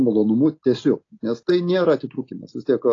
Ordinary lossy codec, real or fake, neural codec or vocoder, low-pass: AAC, 32 kbps; real; none; 7.2 kHz